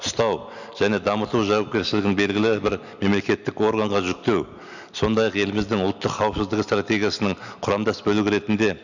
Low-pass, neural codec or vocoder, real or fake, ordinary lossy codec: 7.2 kHz; none; real; none